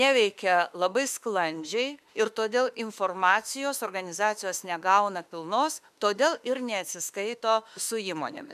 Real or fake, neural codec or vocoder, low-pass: fake; autoencoder, 48 kHz, 32 numbers a frame, DAC-VAE, trained on Japanese speech; 14.4 kHz